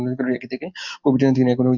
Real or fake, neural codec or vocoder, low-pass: real; none; 7.2 kHz